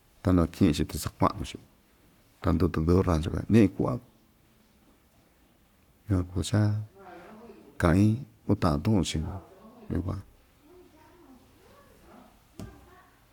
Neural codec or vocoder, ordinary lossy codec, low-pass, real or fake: codec, 44.1 kHz, 7.8 kbps, Pupu-Codec; none; 19.8 kHz; fake